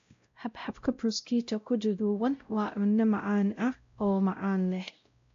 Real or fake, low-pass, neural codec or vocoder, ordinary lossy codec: fake; 7.2 kHz; codec, 16 kHz, 0.5 kbps, X-Codec, WavLM features, trained on Multilingual LibriSpeech; AAC, 48 kbps